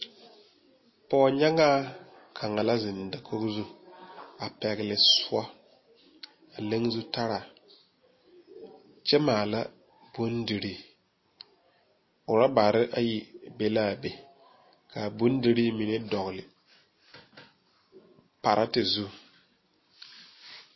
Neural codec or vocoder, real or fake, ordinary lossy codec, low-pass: none; real; MP3, 24 kbps; 7.2 kHz